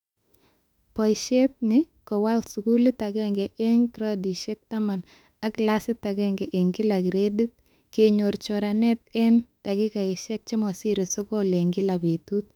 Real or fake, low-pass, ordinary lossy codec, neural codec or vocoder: fake; 19.8 kHz; none; autoencoder, 48 kHz, 32 numbers a frame, DAC-VAE, trained on Japanese speech